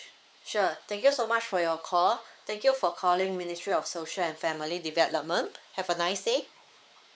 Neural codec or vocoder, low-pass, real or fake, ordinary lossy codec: codec, 16 kHz, 4 kbps, X-Codec, WavLM features, trained on Multilingual LibriSpeech; none; fake; none